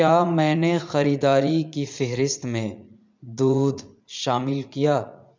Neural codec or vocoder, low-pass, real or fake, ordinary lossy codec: vocoder, 44.1 kHz, 80 mel bands, Vocos; 7.2 kHz; fake; MP3, 64 kbps